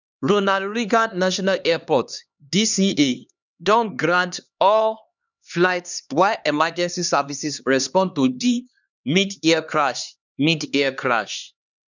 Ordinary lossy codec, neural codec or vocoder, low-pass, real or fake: none; codec, 16 kHz, 2 kbps, X-Codec, HuBERT features, trained on LibriSpeech; 7.2 kHz; fake